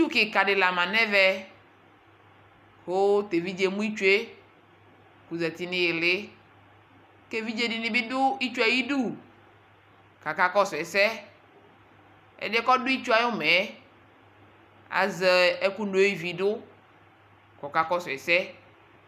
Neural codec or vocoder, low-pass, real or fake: none; 14.4 kHz; real